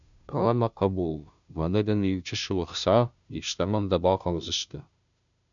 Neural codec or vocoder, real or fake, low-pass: codec, 16 kHz, 0.5 kbps, FunCodec, trained on Chinese and English, 25 frames a second; fake; 7.2 kHz